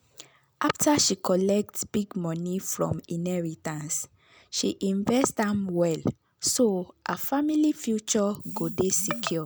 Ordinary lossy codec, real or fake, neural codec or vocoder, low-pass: none; real; none; none